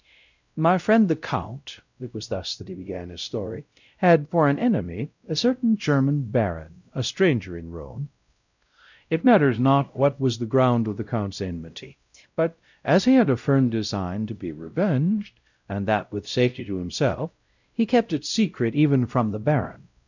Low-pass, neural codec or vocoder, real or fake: 7.2 kHz; codec, 16 kHz, 0.5 kbps, X-Codec, WavLM features, trained on Multilingual LibriSpeech; fake